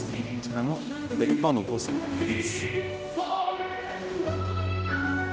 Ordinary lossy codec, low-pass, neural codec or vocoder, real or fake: none; none; codec, 16 kHz, 0.5 kbps, X-Codec, HuBERT features, trained on general audio; fake